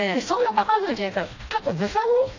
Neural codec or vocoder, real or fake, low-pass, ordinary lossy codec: codec, 16 kHz, 1 kbps, FreqCodec, smaller model; fake; 7.2 kHz; AAC, 48 kbps